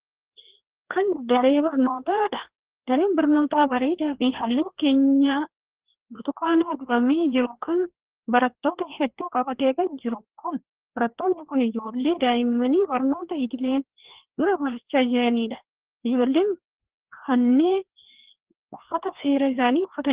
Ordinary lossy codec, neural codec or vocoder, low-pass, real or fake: Opus, 16 kbps; codec, 16 kHz, 2 kbps, FreqCodec, larger model; 3.6 kHz; fake